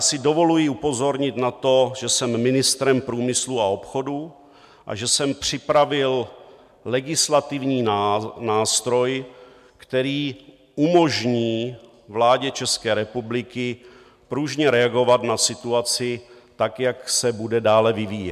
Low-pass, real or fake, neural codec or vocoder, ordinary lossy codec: 14.4 kHz; real; none; MP3, 96 kbps